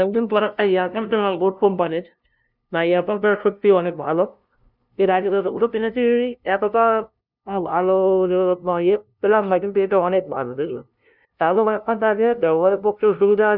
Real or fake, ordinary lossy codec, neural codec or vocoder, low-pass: fake; none; codec, 16 kHz, 0.5 kbps, FunCodec, trained on LibriTTS, 25 frames a second; 5.4 kHz